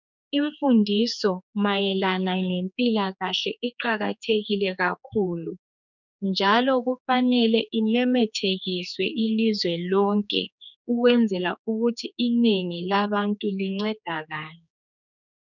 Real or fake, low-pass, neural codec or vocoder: fake; 7.2 kHz; codec, 16 kHz, 4 kbps, X-Codec, HuBERT features, trained on general audio